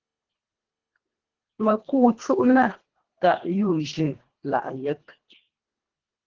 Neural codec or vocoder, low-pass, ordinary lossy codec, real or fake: codec, 24 kHz, 1.5 kbps, HILCodec; 7.2 kHz; Opus, 16 kbps; fake